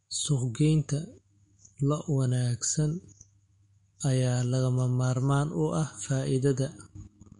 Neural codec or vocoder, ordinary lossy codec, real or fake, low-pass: autoencoder, 48 kHz, 128 numbers a frame, DAC-VAE, trained on Japanese speech; MP3, 48 kbps; fake; 19.8 kHz